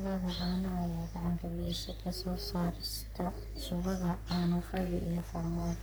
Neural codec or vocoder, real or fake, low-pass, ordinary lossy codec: codec, 44.1 kHz, 3.4 kbps, Pupu-Codec; fake; none; none